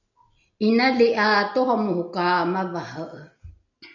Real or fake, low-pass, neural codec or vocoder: real; 7.2 kHz; none